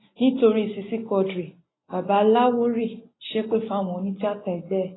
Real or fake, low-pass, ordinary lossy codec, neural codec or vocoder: real; 7.2 kHz; AAC, 16 kbps; none